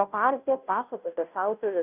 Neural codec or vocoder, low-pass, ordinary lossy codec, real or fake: codec, 16 kHz, 0.5 kbps, FunCodec, trained on Chinese and English, 25 frames a second; 3.6 kHz; Opus, 64 kbps; fake